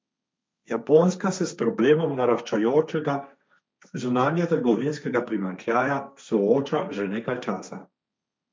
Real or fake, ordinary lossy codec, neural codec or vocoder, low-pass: fake; none; codec, 16 kHz, 1.1 kbps, Voila-Tokenizer; none